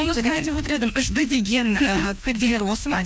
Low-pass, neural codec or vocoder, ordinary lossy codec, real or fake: none; codec, 16 kHz, 1 kbps, FreqCodec, larger model; none; fake